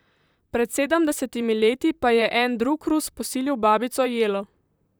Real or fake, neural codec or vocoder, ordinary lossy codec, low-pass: fake; vocoder, 44.1 kHz, 128 mel bands, Pupu-Vocoder; none; none